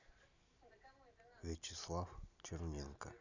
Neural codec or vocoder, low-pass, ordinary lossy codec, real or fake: none; 7.2 kHz; none; real